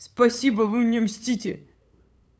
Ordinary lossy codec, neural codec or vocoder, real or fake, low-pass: none; codec, 16 kHz, 8 kbps, FunCodec, trained on LibriTTS, 25 frames a second; fake; none